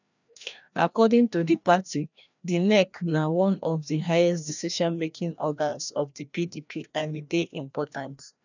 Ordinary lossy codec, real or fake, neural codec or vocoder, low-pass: none; fake; codec, 16 kHz, 1 kbps, FreqCodec, larger model; 7.2 kHz